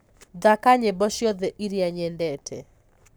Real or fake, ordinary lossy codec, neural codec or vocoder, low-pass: fake; none; codec, 44.1 kHz, 7.8 kbps, Pupu-Codec; none